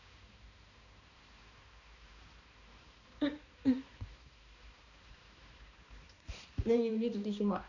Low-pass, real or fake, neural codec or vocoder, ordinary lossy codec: 7.2 kHz; fake; codec, 16 kHz, 2 kbps, X-Codec, HuBERT features, trained on balanced general audio; none